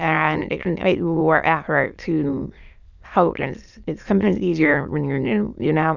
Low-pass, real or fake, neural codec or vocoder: 7.2 kHz; fake; autoencoder, 22.05 kHz, a latent of 192 numbers a frame, VITS, trained on many speakers